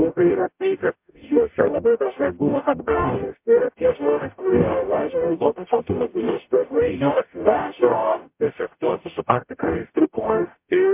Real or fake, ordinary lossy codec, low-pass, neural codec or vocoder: fake; MP3, 32 kbps; 3.6 kHz; codec, 44.1 kHz, 0.9 kbps, DAC